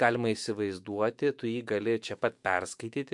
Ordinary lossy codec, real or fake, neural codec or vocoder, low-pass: MP3, 64 kbps; fake; autoencoder, 48 kHz, 128 numbers a frame, DAC-VAE, trained on Japanese speech; 10.8 kHz